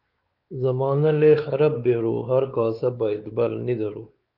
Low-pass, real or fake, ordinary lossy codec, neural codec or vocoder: 5.4 kHz; fake; Opus, 32 kbps; codec, 16 kHz, 4 kbps, X-Codec, WavLM features, trained on Multilingual LibriSpeech